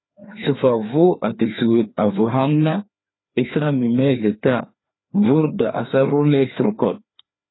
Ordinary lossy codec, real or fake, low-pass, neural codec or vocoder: AAC, 16 kbps; fake; 7.2 kHz; codec, 16 kHz, 2 kbps, FreqCodec, larger model